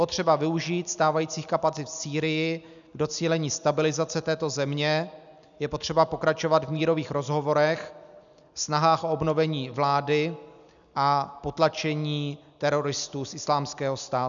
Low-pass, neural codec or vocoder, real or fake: 7.2 kHz; none; real